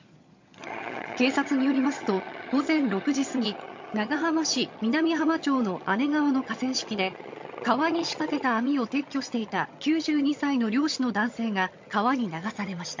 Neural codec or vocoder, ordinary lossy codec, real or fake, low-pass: vocoder, 22.05 kHz, 80 mel bands, HiFi-GAN; MP3, 48 kbps; fake; 7.2 kHz